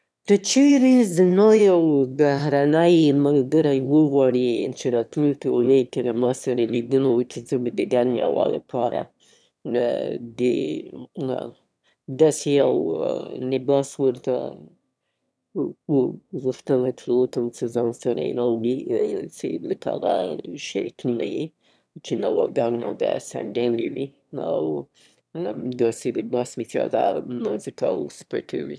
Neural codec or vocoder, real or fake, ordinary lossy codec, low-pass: autoencoder, 22.05 kHz, a latent of 192 numbers a frame, VITS, trained on one speaker; fake; none; none